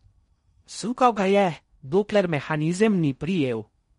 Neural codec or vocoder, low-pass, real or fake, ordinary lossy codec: codec, 16 kHz in and 24 kHz out, 0.6 kbps, FocalCodec, streaming, 4096 codes; 10.8 kHz; fake; MP3, 48 kbps